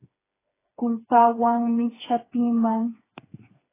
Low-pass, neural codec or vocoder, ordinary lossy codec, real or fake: 3.6 kHz; codec, 16 kHz, 4 kbps, FreqCodec, smaller model; AAC, 16 kbps; fake